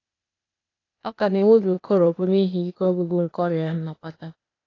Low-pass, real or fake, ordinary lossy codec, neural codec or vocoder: 7.2 kHz; fake; AAC, 32 kbps; codec, 16 kHz, 0.8 kbps, ZipCodec